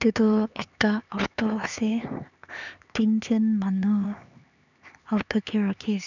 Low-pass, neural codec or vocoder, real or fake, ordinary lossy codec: 7.2 kHz; codec, 16 kHz, 4 kbps, X-Codec, HuBERT features, trained on LibriSpeech; fake; none